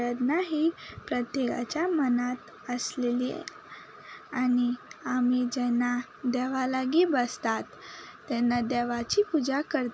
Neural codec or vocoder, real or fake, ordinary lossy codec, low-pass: none; real; none; none